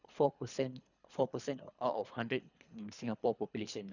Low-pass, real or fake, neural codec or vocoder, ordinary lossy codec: 7.2 kHz; fake; codec, 24 kHz, 3 kbps, HILCodec; none